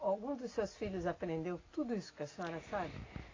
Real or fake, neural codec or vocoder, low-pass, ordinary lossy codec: real; none; 7.2 kHz; AAC, 32 kbps